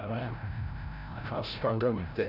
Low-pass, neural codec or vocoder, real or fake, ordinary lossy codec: 5.4 kHz; codec, 16 kHz, 0.5 kbps, FreqCodec, larger model; fake; none